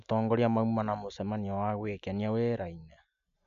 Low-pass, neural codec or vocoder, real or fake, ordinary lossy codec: 7.2 kHz; none; real; none